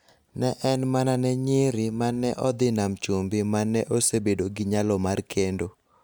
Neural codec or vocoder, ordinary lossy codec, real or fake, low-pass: none; none; real; none